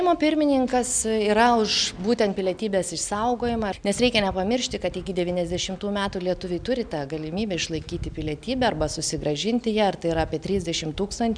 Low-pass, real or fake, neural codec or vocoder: 9.9 kHz; real; none